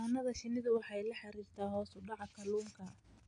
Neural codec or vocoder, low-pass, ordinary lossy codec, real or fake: none; 9.9 kHz; AAC, 48 kbps; real